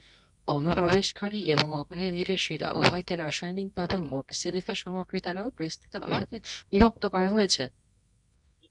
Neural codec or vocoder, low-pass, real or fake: codec, 24 kHz, 0.9 kbps, WavTokenizer, medium music audio release; 10.8 kHz; fake